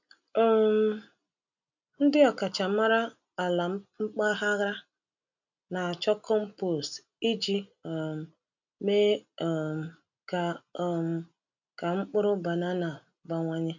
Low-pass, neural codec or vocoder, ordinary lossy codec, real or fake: 7.2 kHz; none; none; real